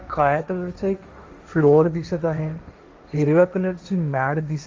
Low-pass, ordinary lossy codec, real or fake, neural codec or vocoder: 7.2 kHz; Opus, 32 kbps; fake; codec, 16 kHz, 1.1 kbps, Voila-Tokenizer